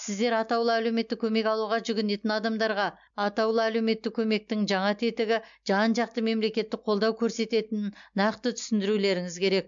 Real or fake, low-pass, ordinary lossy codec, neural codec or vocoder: real; 7.2 kHz; MP3, 64 kbps; none